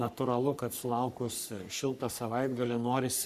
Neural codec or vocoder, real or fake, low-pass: codec, 44.1 kHz, 3.4 kbps, Pupu-Codec; fake; 14.4 kHz